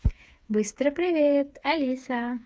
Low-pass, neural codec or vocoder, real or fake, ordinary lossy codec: none; codec, 16 kHz, 4 kbps, FreqCodec, smaller model; fake; none